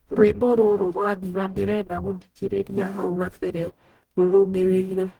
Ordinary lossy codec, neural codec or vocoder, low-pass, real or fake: Opus, 24 kbps; codec, 44.1 kHz, 0.9 kbps, DAC; 19.8 kHz; fake